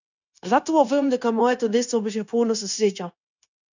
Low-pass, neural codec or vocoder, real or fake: 7.2 kHz; codec, 16 kHz, 0.9 kbps, LongCat-Audio-Codec; fake